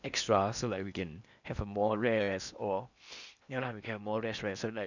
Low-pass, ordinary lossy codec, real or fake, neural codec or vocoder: 7.2 kHz; none; fake; codec, 16 kHz in and 24 kHz out, 0.8 kbps, FocalCodec, streaming, 65536 codes